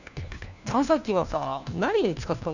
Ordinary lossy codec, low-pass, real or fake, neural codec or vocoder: none; 7.2 kHz; fake; codec, 16 kHz, 1 kbps, FunCodec, trained on LibriTTS, 50 frames a second